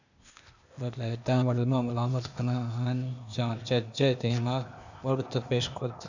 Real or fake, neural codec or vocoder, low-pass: fake; codec, 16 kHz, 0.8 kbps, ZipCodec; 7.2 kHz